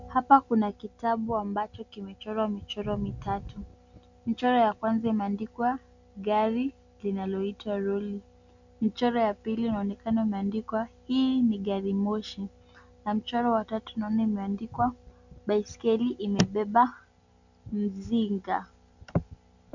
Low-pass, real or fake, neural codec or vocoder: 7.2 kHz; real; none